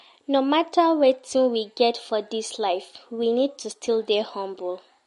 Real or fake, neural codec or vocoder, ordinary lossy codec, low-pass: real; none; MP3, 48 kbps; 14.4 kHz